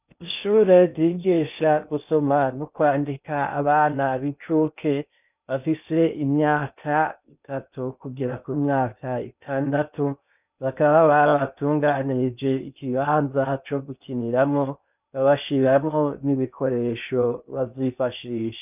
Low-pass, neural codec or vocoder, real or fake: 3.6 kHz; codec, 16 kHz in and 24 kHz out, 0.6 kbps, FocalCodec, streaming, 2048 codes; fake